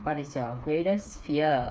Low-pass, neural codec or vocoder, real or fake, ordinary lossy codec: none; codec, 16 kHz, 4 kbps, FreqCodec, smaller model; fake; none